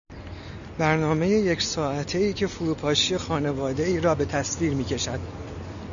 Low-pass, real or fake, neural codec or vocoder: 7.2 kHz; real; none